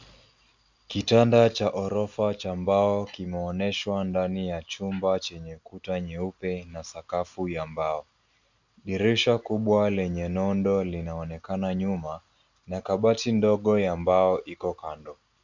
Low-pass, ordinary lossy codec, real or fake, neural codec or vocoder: 7.2 kHz; Opus, 64 kbps; real; none